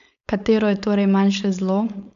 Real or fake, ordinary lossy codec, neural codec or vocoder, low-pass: fake; none; codec, 16 kHz, 4.8 kbps, FACodec; 7.2 kHz